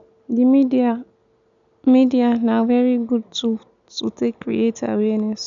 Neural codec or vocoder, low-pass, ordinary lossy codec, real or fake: none; 7.2 kHz; none; real